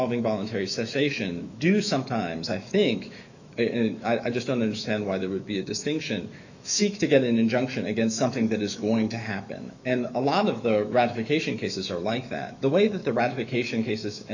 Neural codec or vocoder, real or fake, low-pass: autoencoder, 48 kHz, 128 numbers a frame, DAC-VAE, trained on Japanese speech; fake; 7.2 kHz